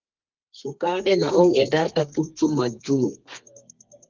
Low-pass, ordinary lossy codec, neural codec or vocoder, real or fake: 7.2 kHz; Opus, 32 kbps; codec, 32 kHz, 1.9 kbps, SNAC; fake